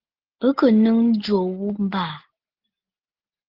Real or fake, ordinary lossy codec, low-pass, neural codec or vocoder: real; Opus, 16 kbps; 5.4 kHz; none